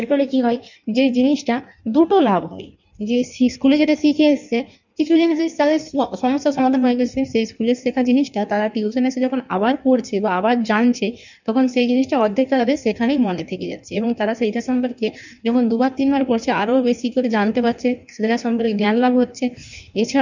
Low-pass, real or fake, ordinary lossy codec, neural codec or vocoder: 7.2 kHz; fake; none; codec, 16 kHz in and 24 kHz out, 1.1 kbps, FireRedTTS-2 codec